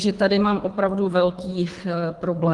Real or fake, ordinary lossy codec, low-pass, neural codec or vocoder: fake; Opus, 24 kbps; 10.8 kHz; codec, 24 kHz, 3 kbps, HILCodec